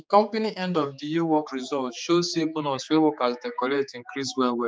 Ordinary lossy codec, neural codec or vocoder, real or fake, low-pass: none; codec, 16 kHz, 4 kbps, X-Codec, HuBERT features, trained on general audio; fake; none